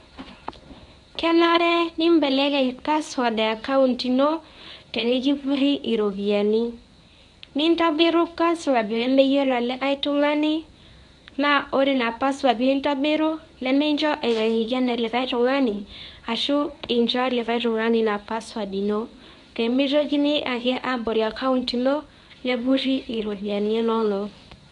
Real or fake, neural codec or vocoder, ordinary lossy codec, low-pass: fake; codec, 24 kHz, 0.9 kbps, WavTokenizer, medium speech release version 1; none; 10.8 kHz